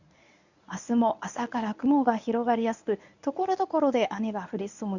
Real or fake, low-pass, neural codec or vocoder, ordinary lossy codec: fake; 7.2 kHz; codec, 24 kHz, 0.9 kbps, WavTokenizer, medium speech release version 1; none